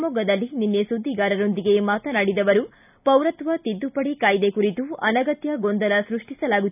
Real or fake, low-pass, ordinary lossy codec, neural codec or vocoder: real; 3.6 kHz; none; none